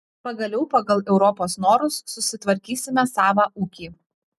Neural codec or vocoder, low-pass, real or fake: none; 14.4 kHz; real